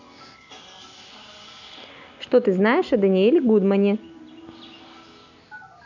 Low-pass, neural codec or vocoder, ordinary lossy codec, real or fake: 7.2 kHz; none; none; real